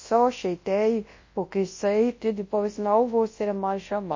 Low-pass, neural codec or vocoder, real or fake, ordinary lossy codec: 7.2 kHz; codec, 24 kHz, 0.9 kbps, WavTokenizer, large speech release; fake; MP3, 32 kbps